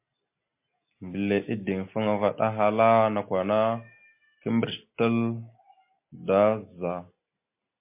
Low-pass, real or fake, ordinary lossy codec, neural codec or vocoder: 3.6 kHz; real; MP3, 24 kbps; none